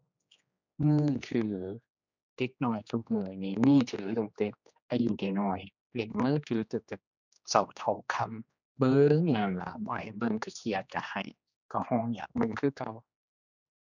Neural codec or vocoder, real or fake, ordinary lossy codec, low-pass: codec, 16 kHz, 2 kbps, X-Codec, HuBERT features, trained on general audio; fake; none; 7.2 kHz